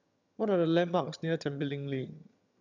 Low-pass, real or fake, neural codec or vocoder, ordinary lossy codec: 7.2 kHz; fake; vocoder, 22.05 kHz, 80 mel bands, HiFi-GAN; none